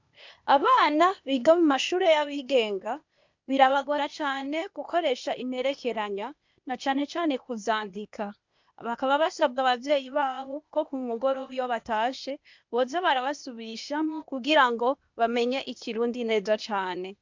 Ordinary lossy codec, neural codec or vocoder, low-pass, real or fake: MP3, 64 kbps; codec, 16 kHz, 0.8 kbps, ZipCodec; 7.2 kHz; fake